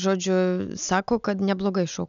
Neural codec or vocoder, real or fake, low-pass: none; real; 7.2 kHz